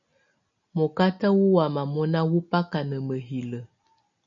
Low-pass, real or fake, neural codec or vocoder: 7.2 kHz; real; none